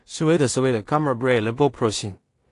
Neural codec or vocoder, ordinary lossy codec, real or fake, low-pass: codec, 16 kHz in and 24 kHz out, 0.4 kbps, LongCat-Audio-Codec, two codebook decoder; AAC, 48 kbps; fake; 10.8 kHz